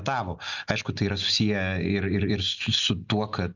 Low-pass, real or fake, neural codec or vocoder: 7.2 kHz; real; none